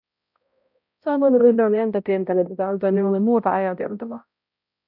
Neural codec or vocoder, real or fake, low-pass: codec, 16 kHz, 0.5 kbps, X-Codec, HuBERT features, trained on balanced general audio; fake; 5.4 kHz